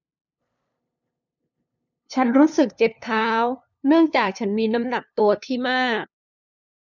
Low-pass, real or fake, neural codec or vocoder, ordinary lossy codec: 7.2 kHz; fake; codec, 16 kHz, 2 kbps, FunCodec, trained on LibriTTS, 25 frames a second; none